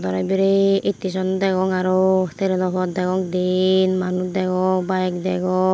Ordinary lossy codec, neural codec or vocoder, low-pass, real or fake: none; none; none; real